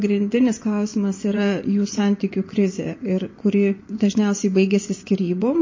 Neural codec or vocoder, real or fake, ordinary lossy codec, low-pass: vocoder, 24 kHz, 100 mel bands, Vocos; fake; MP3, 32 kbps; 7.2 kHz